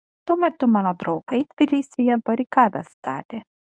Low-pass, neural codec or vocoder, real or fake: 9.9 kHz; codec, 24 kHz, 0.9 kbps, WavTokenizer, medium speech release version 1; fake